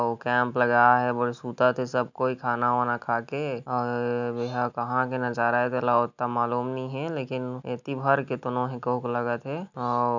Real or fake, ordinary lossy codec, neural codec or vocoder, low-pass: real; none; none; 7.2 kHz